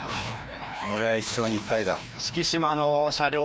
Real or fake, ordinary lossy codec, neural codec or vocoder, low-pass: fake; none; codec, 16 kHz, 1 kbps, FreqCodec, larger model; none